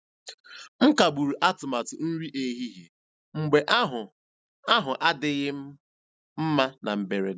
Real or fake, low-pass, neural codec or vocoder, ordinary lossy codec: real; none; none; none